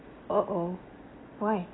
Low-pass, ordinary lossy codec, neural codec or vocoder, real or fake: 7.2 kHz; AAC, 16 kbps; none; real